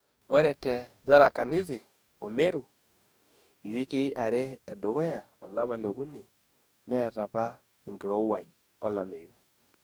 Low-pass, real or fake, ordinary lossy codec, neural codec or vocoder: none; fake; none; codec, 44.1 kHz, 2.6 kbps, DAC